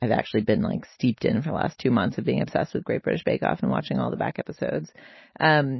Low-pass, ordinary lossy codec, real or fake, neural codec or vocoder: 7.2 kHz; MP3, 24 kbps; real; none